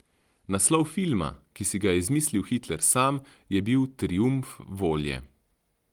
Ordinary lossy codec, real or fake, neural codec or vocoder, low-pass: Opus, 24 kbps; real; none; 19.8 kHz